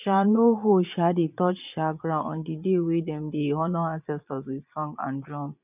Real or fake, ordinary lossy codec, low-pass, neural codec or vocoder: fake; none; 3.6 kHz; vocoder, 22.05 kHz, 80 mel bands, Vocos